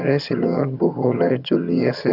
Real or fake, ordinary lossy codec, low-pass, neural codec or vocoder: fake; AAC, 48 kbps; 5.4 kHz; vocoder, 22.05 kHz, 80 mel bands, HiFi-GAN